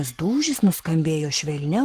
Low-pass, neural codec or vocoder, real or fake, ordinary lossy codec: 14.4 kHz; codec, 44.1 kHz, 7.8 kbps, Pupu-Codec; fake; Opus, 16 kbps